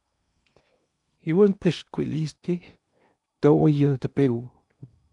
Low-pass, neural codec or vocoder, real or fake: 10.8 kHz; codec, 16 kHz in and 24 kHz out, 0.8 kbps, FocalCodec, streaming, 65536 codes; fake